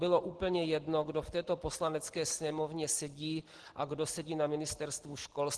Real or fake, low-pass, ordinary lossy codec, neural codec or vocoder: real; 10.8 kHz; Opus, 16 kbps; none